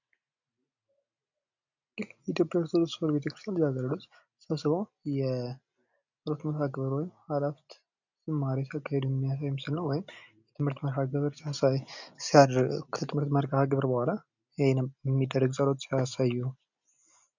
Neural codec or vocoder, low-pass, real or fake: none; 7.2 kHz; real